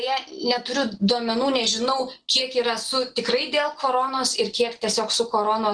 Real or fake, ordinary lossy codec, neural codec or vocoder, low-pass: real; Opus, 16 kbps; none; 9.9 kHz